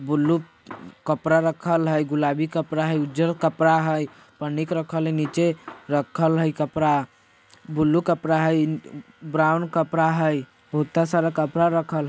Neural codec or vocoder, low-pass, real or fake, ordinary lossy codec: none; none; real; none